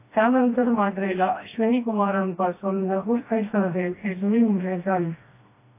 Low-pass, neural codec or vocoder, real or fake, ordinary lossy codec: 3.6 kHz; codec, 16 kHz, 1 kbps, FreqCodec, smaller model; fake; AAC, 24 kbps